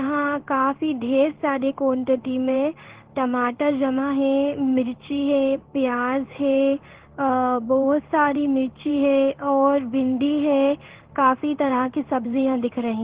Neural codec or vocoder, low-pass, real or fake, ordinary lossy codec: codec, 16 kHz in and 24 kHz out, 1 kbps, XY-Tokenizer; 3.6 kHz; fake; Opus, 16 kbps